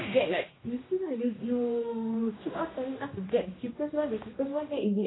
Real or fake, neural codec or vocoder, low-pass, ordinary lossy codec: fake; codec, 16 kHz, 1 kbps, X-Codec, HuBERT features, trained on general audio; 7.2 kHz; AAC, 16 kbps